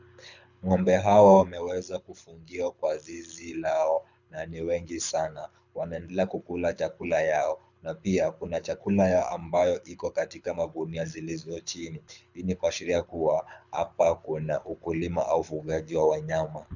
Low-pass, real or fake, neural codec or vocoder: 7.2 kHz; fake; codec, 24 kHz, 6 kbps, HILCodec